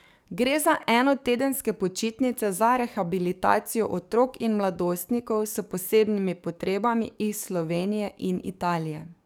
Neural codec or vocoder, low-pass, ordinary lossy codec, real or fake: codec, 44.1 kHz, 7.8 kbps, DAC; none; none; fake